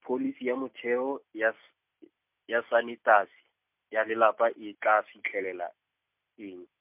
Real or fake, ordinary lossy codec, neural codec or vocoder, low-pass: real; MP3, 32 kbps; none; 3.6 kHz